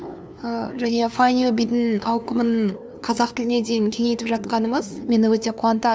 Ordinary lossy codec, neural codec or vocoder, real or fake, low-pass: none; codec, 16 kHz, 2 kbps, FunCodec, trained on LibriTTS, 25 frames a second; fake; none